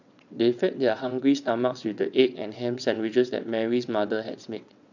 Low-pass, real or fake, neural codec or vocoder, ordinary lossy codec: 7.2 kHz; fake; vocoder, 22.05 kHz, 80 mel bands, WaveNeXt; none